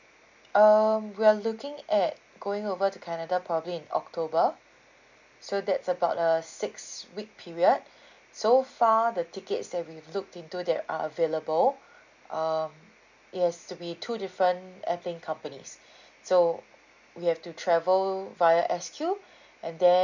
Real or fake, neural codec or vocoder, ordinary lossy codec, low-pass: real; none; none; 7.2 kHz